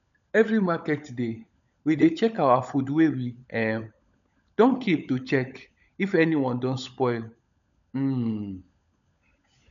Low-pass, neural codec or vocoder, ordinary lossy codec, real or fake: 7.2 kHz; codec, 16 kHz, 16 kbps, FunCodec, trained on LibriTTS, 50 frames a second; none; fake